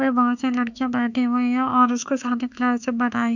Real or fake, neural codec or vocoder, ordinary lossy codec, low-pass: fake; autoencoder, 48 kHz, 32 numbers a frame, DAC-VAE, trained on Japanese speech; none; 7.2 kHz